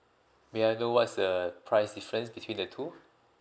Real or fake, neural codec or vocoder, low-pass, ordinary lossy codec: real; none; none; none